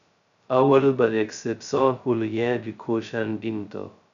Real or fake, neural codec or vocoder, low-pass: fake; codec, 16 kHz, 0.2 kbps, FocalCodec; 7.2 kHz